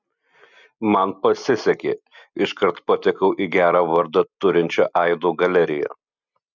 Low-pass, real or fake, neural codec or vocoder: 7.2 kHz; real; none